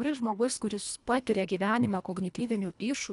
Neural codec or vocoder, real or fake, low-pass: codec, 24 kHz, 1.5 kbps, HILCodec; fake; 10.8 kHz